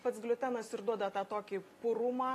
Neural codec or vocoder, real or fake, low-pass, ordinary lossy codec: none; real; 14.4 kHz; AAC, 48 kbps